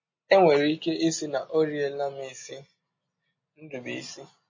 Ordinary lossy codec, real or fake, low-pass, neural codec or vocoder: MP3, 32 kbps; real; 7.2 kHz; none